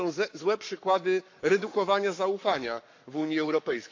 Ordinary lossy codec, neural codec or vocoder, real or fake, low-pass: AAC, 48 kbps; codec, 44.1 kHz, 7.8 kbps, Pupu-Codec; fake; 7.2 kHz